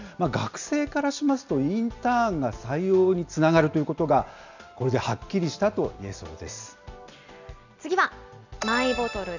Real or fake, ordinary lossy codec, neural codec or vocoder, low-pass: real; none; none; 7.2 kHz